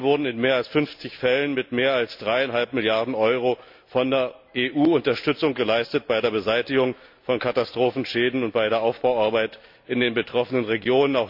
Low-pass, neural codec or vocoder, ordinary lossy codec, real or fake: 5.4 kHz; none; MP3, 48 kbps; real